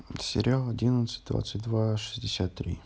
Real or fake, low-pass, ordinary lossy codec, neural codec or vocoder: real; none; none; none